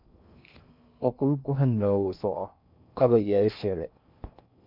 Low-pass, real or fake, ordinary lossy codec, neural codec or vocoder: 5.4 kHz; fake; MP3, 48 kbps; codec, 16 kHz in and 24 kHz out, 0.6 kbps, FocalCodec, streaming, 2048 codes